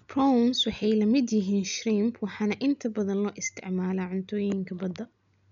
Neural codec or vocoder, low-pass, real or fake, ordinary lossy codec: none; 7.2 kHz; real; none